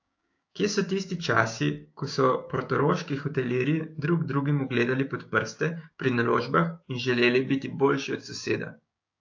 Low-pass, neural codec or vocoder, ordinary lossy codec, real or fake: 7.2 kHz; codec, 16 kHz, 6 kbps, DAC; AAC, 48 kbps; fake